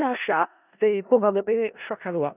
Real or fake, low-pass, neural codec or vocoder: fake; 3.6 kHz; codec, 16 kHz in and 24 kHz out, 0.4 kbps, LongCat-Audio-Codec, four codebook decoder